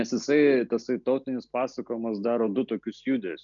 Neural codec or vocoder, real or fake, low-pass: none; real; 7.2 kHz